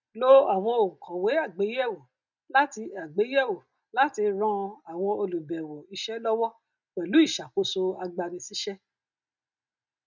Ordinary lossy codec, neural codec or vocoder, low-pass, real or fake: none; none; 7.2 kHz; real